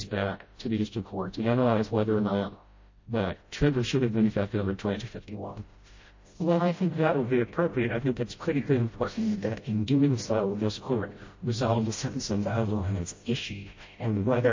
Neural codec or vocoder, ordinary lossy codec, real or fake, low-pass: codec, 16 kHz, 0.5 kbps, FreqCodec, smaller model; MP3, 32 kbps; fake; 7.2 kHz